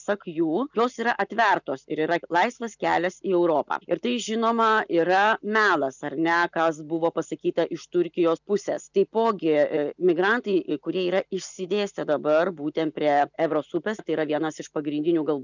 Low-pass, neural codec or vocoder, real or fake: 7.2 kHz; none; real